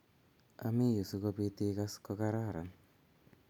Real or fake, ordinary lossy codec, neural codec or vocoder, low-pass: real; none; none; 19.8 kHz